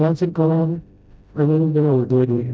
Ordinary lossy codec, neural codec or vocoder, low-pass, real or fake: none; codec, 16 kHz, 0.5 kbps, FreqCodec, smaller model; none; fake